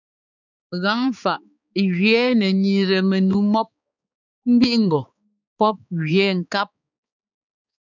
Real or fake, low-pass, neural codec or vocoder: fake; 7.2 kHz; codec, 16 kHz, 4 kbps, X-Codec, HuBERT features, trained on balanced general audio